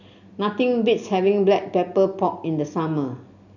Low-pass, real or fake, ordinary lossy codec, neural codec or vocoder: 7.2 kHz; real; none; none